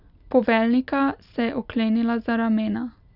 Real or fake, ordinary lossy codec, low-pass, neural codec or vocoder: real; none; 5.4 kHz; none